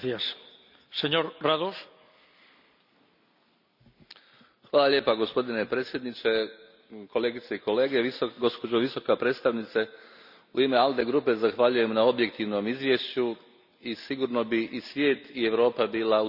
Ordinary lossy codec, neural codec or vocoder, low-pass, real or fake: none; none; 5.4 kHz; real